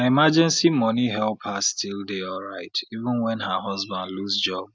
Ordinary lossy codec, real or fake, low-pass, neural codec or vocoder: none; real; none; none